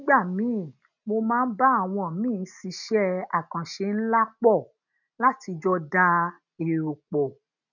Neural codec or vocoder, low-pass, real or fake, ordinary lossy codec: none; 7.2 kHz; real; none